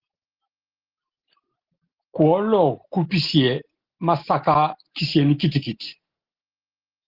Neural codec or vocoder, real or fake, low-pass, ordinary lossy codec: none; real; 5.4 kHz; Opus, 16 kbps